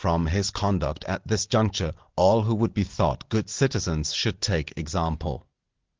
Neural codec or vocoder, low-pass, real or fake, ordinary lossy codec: none; 7.2 kHz; real; Opus, 16 kbps